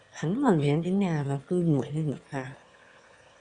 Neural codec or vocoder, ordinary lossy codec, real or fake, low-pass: autoencoder, 22.05 kHz, a latent of 192 numbers a frame, VITS, trained on one speaker; Opus, 64 kbps; fake; 9.9 kHz